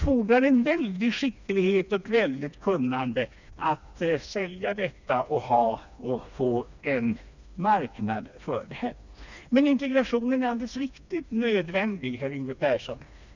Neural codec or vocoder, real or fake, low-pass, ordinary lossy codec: codec, 16 kHz, 2 kbps, FreqCodec, smaller model; fake; 7.2 kHz; none